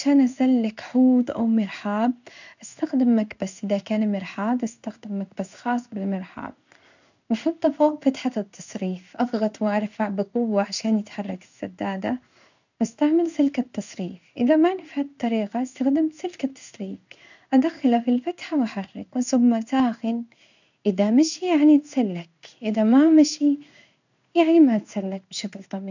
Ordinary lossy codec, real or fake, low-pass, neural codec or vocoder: none; fake; 7.2 kHz; codec, 16 kHz in and 24 kHz out, 1 kbps, XY-Tokenizer